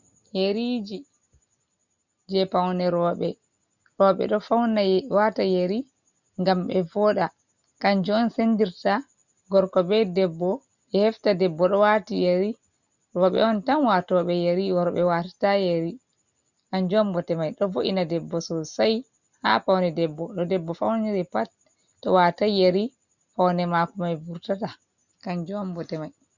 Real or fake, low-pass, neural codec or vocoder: real; 7.2 kHz; none